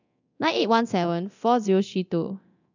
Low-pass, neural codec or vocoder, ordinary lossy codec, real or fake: 7.2 kHz; codec, 24 kHz, 0.9 kbps, DualCodec; none; fake